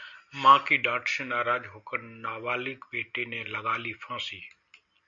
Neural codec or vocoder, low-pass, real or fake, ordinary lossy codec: none; 7.2 kHz; real; MP3, 48 kbps